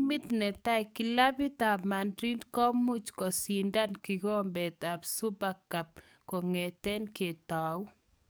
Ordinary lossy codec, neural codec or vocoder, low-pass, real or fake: none; codec, 44.1 kHz, 7.8 kbps, DAC; none; fake